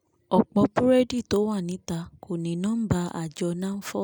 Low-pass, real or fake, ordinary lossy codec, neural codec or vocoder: none; real; none; none